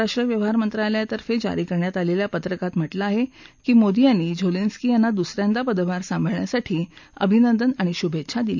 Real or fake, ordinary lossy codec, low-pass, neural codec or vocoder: real; none; 7.2 kHz; none